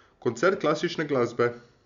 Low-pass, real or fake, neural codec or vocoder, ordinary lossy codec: 7.2 kHz; real; none; Opus, 64 kbps